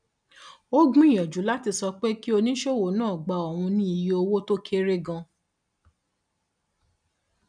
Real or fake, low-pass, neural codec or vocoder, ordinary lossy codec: real; 9.9 kHz; none; none